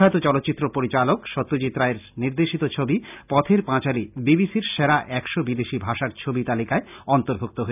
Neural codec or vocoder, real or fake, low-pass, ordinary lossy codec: none; real; 3.6 kHz; none